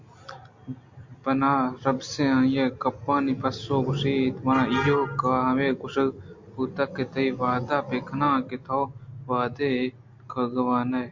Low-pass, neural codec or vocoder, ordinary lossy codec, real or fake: 7.2 kHz; none; MP3, 48 kbps; real